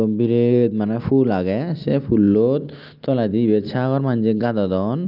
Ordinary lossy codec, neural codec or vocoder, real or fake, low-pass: Opus, 24 kbps; none; real; 5.4 kHz